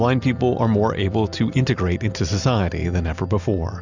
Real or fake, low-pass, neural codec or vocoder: real; 7.2 kHz; none